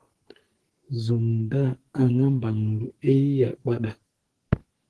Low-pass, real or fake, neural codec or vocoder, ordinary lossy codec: 10.8 kHz; fake; codec, 44.1 kHz, 2.6 kbps, SNAC; Opus, 16 kbps